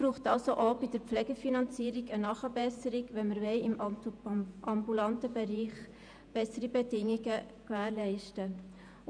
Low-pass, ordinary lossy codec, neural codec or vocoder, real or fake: 9.9 kHz; none; vocoder, 48 kHz, 128 mel bands, Vocos; fake